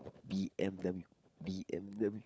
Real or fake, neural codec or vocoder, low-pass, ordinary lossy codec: fake; codec, 16 kHz, 16 kbps, FunCodec, trained on LibriTTS, 50 frames a second; none; none